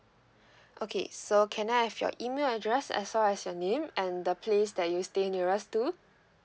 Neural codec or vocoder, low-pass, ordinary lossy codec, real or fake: none; none; none; real